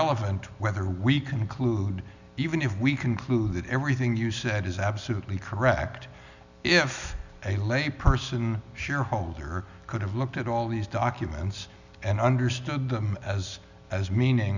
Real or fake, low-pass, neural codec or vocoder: real; 7.2 kHz; none